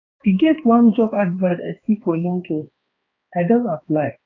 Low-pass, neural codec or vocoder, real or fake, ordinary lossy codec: 7.2 kHz; codec, 16 kHz, 2 kbps, X-Codec, HuBERT features, trained on balanced general audio; fake; AAC, 32 kbps